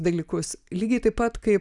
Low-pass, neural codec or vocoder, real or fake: 10.8 kHz; none; real